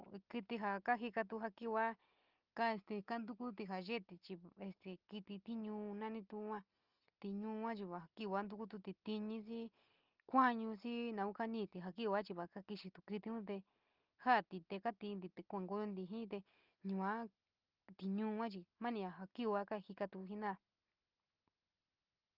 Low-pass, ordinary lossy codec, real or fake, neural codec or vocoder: 5.4 kHz; Opus, 24 kbps; real; none